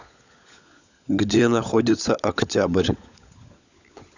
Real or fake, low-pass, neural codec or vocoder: fake; 7.2 kHz; codec, 16 kHz, 16 kbps, FunCodec, trained on LibriTTS, 50 frames a second